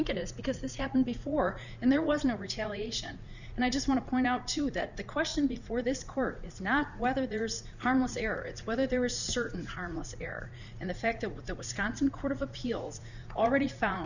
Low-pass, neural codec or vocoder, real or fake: 7.2 kHz; vocoder, 44.1 kHz, 80 mel bands, Vocos; fake